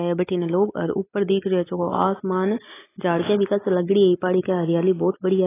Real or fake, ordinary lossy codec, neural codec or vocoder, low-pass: real; AAC, 16 kbps; none; 3.6 kHz